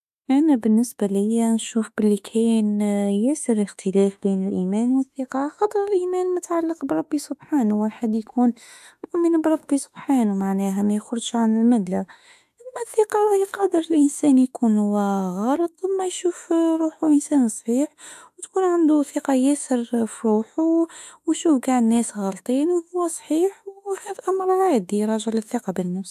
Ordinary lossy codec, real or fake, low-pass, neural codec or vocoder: AAC, 96 kbps; fake; 14.4 kHz; autoencoder, 48 kHz, 32 numbers a frame, DAC-VAE, trained on Japanese speech